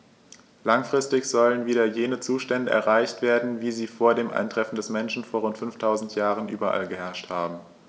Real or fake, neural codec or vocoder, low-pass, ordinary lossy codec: real; none; none; none